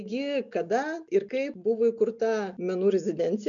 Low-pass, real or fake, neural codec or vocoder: 7.2 kHz; real; none